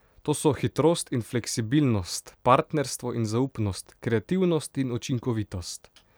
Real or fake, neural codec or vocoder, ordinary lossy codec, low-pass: fake; vocoder, 44.1 kHz, 128 mel bands every 256 samples, BigVGAN v2; none; none